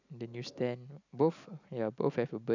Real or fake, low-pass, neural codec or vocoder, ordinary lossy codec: real; 7.2 kHz; none; none